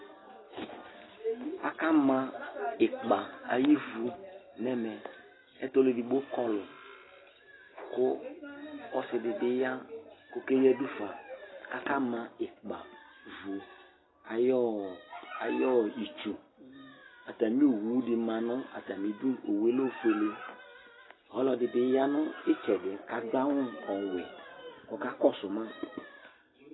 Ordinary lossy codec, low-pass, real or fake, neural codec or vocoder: AAC, 16 kbps; 7.2 kHz; real; none